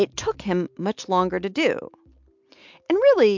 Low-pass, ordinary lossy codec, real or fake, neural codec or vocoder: 7.2 kHz; MP3, 64 kbps; real; none